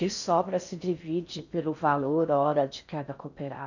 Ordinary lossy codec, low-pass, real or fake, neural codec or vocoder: none; 7.2 kHz; fake; codec, 16 kHz in and 24 kHz out, 0.6 kbps, FocalCodec, streaming, 4096 codes